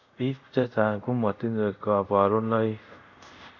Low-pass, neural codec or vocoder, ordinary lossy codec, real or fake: 7.2 kHz; codec, 24 kHz, 0.5 kbps, DualCodec; none; fake